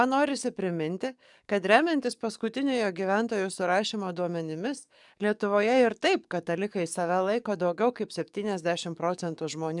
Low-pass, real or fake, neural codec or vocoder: 10.8 kHz; fake; codec, 44.1 kHz, 7.8 kbps, DAC